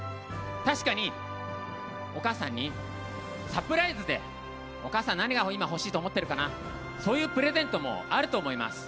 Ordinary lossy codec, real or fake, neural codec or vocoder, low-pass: none; real; none; none